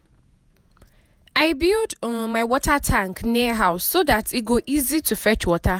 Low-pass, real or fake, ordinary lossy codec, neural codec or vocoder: 19.8 kHz; fake; none; vocoder, 48 kHz, 128 mel bands, Vocos